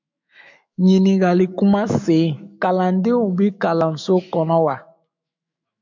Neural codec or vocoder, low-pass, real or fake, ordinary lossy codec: autoencoder, 48 kHz, 128 numbers a frame, DAC-VAE, trained on Japanese speech; 7.2 kHz; fake; MP3, 64 kbps